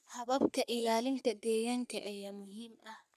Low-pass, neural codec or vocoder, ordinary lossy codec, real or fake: 14.4 kHz; codec, 44.1 kHz, 3.4 kbps, Pupu-Codec; none; fake